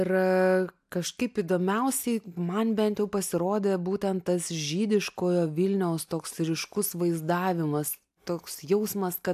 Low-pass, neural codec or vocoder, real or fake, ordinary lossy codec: 14.4 kHz; none; real; AAC, 96 kbps